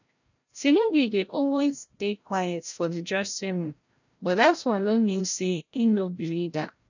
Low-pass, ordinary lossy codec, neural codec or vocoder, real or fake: 7.2 kHz; none; codec, 16 kHz, 0.5 kbps, FreqCodec, larger model; fake